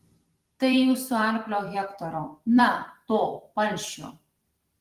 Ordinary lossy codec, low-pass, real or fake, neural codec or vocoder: Opus, 24 kbps; 14.4 kHz; fake; vocoder, 44.1 kHz, 128 mel bands every 256 samples, BigVGAN v2